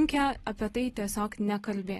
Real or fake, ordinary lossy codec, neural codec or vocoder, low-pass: real; AAC, 32 kbps; none; 19.8 kHz